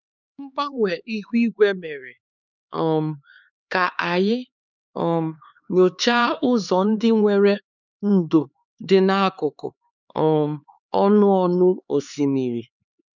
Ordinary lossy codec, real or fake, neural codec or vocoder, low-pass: none; fake; codec, 16 kHz, 4 kbps, X-Codec, HuBERT features, trained on LibriSpeech; 7.2 kHz